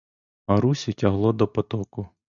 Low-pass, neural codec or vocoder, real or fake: 7.2 kHz; none; real